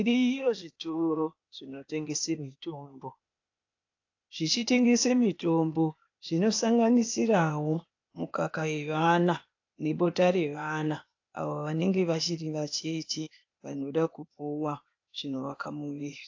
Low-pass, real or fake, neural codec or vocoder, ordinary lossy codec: 7.2 kHz; fake; codec, 16 kHz, 0.8 kbps, ZipCodec; AAC, 48 kbps